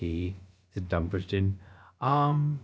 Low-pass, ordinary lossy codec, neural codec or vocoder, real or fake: none; none; codec, 16 kHz, about 1 kbps, DyCAST, with the encoder's durations; fake